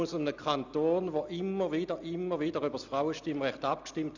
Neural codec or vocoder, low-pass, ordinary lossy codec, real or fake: none; 7.2 kHz; none; real